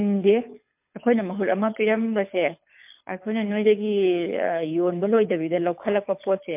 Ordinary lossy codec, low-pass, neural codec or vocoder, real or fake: MP3, 32 kbps; 3.6 kHz; codec, 24 kHz, 6 kbps, HILCodec; fake